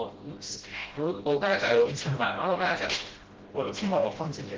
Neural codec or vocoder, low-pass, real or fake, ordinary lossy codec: codec, 16 kHz, 0.5 kbps, FreqCodec, smaller model; 7.2 kHz; fake; Opus, 16 kbps